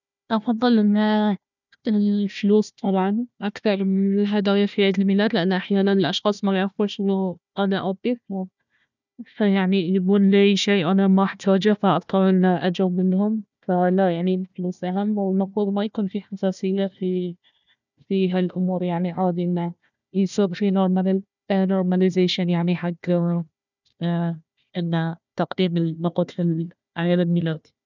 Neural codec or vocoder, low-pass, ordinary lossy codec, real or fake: codec, 16 kHz, 1 kbps, FunCodec, trained on Chinese and English, 50 frames a second; 7.2 kHz; none; fake